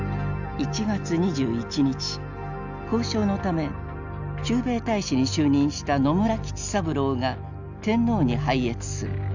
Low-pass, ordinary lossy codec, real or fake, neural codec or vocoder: 7.2 kHz; none; real; none